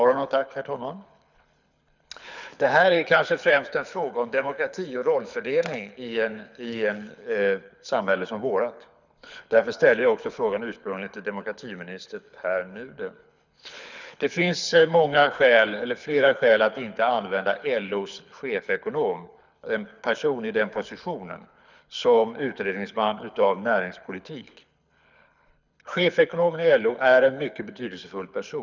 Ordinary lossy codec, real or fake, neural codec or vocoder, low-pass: none; fake; codec, 24 kHz, 6 kbps, HILCodec; 7.2 kHz